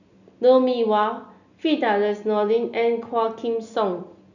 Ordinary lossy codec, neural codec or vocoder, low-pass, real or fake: none; none; 7.2 kHz; real